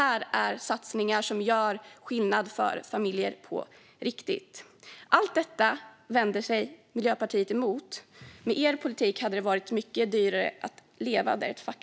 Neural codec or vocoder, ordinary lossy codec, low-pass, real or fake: none; none; none; real